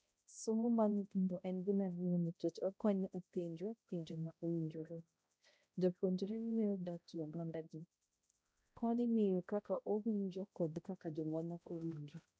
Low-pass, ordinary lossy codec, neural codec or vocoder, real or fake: none; none; codec, 16 kHz, 0.5 kbps, X-Codec, HuBERT features, trained on balanced general audio; fake